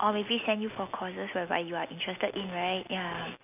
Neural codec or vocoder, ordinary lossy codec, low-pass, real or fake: none; none; 3.6 kHz; real